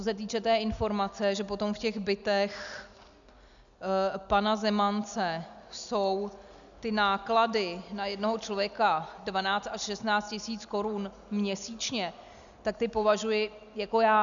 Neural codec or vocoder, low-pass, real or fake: none; 7.2 kHz; real